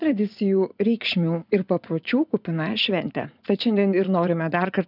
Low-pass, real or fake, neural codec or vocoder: 5.4 kHz; fake; vocoder, 24 kHz, 100 mel bands, Vocos